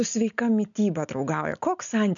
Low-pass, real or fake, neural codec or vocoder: 7.2 kHz; real; none